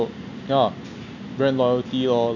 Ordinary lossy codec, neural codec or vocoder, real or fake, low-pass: none; none; real; 7.2 kHz